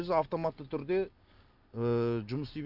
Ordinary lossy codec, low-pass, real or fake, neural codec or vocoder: none; 5.4 kHz; real; none